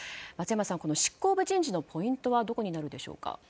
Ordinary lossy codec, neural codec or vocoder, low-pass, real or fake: none; none; none; real